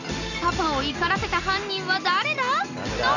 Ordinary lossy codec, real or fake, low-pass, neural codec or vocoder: none; real; 7.2 kHz; none